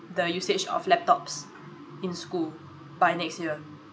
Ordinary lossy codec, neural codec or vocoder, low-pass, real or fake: none; none; none; real